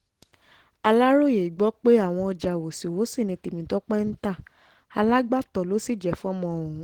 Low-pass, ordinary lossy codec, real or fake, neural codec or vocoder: 19.8 kHz; Opus, 16 kbps; real; none